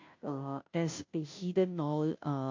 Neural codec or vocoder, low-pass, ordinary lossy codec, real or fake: codec, 16 kHz, 0.5 kbps, FunCodec, trained on Chinese and English, 25 frames a second; 7.2 kHz; MP3, 48 kbps; fake